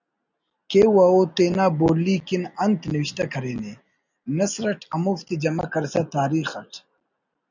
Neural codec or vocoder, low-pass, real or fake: none; 7.2 kHz; real